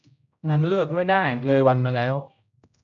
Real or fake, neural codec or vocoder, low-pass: fake; codec, 16 kHz, 0.5 kbps, X-Codec, HuBERT features, trained on general audio; 7.2 kHz